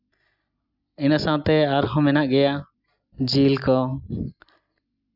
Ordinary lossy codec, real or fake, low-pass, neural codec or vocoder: Opus, 64 kbps; real; 5.4 kHz; none